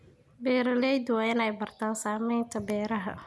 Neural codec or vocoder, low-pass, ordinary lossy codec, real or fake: none; none; none; real